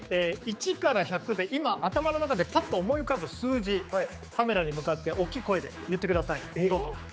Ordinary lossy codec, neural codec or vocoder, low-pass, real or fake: none; codec, 16 kHz, 4 kbps, X-Codec, HuBERT features, trained on general audio; none; fake